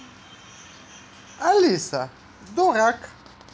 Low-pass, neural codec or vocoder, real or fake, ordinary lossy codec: none; none; real; none